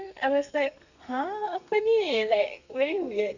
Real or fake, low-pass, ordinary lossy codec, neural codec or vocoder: fake; 7.2 kHz; none; codec, 32 kHz, 1.9 kbps, SNAC